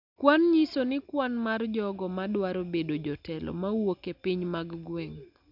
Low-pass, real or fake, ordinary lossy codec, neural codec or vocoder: 5.4 kHz; real; none; none